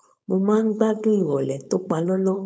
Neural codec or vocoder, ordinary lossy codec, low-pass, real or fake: codec, 16 kHz, 4.8 kbps, FACodec; none; none; fake